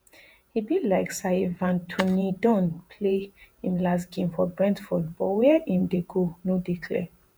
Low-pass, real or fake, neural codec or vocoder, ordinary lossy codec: none; fake; vocoder, 48 kHz, 128 mel bands, Vocos; none